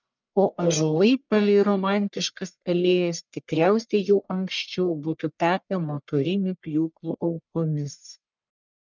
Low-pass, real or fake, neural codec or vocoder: 7.2 kHz; fake; codec, 44.1 kHz, 1.7 kbps, Pupu-Codec